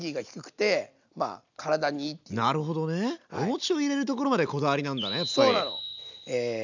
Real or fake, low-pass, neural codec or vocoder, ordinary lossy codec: real; 7.2 kHz; none; none